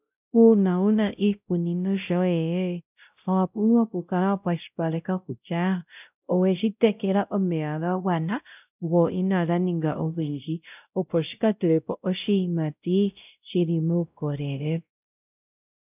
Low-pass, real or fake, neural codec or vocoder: 3.6 kHz; fake; codec, 16 kHz, 0.5 kbps, X-Codec, WavLM features, trained on Multilingual LibriSpeech